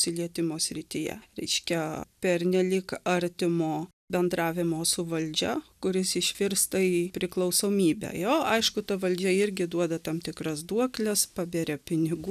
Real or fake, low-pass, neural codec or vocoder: real; 14.4 kHz; none